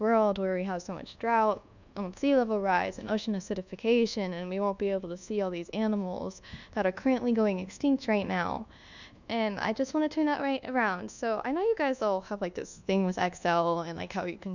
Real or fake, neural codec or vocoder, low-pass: fake; codec, 24 kHz, 1.2 kbps, DualCodec; 7.2 kHz